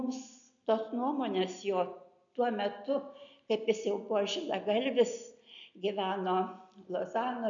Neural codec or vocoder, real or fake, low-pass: none; real; 7.2 kHz